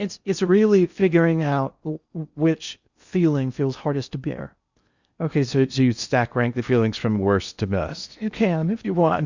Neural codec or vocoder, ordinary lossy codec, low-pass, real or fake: codec, 16 kHz in and 24 kHz out, 0.6 kbps, FocalCodec, streaming, 4096 codes; Opus, 64 kbps; 7.2 kHz; fake